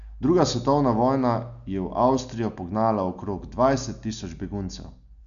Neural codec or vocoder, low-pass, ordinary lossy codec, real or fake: none; 7.2 kHz; none; real